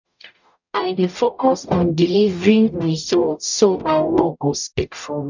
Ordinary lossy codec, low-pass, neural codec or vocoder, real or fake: none; 7.2 kHz; codec, 44.1 kHz, 0.9 kbps, DAC; fake